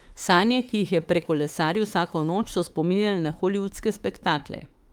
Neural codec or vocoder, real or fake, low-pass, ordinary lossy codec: autoencoder, 48 kHz, 32 numbers a frame, DAC-VAE, trained on Japanese speech; fake; 19.8 kHz; Opus, 32 kbps